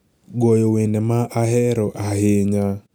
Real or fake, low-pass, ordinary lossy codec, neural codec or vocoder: real; none; none; none